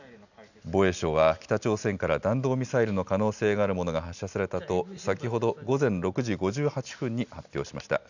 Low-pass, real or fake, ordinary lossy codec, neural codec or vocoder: 7.2 kHz; real; none; none